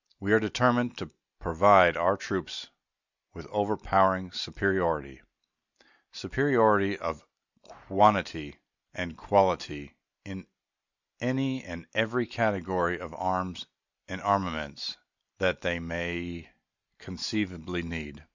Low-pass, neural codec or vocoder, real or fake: 7.2 kHz; none; real